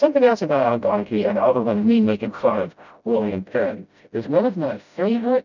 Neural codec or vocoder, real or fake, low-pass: codec, 16 kHz, 0.5 kbps, FreqCodec, smaller model; fake; 7.2 kHz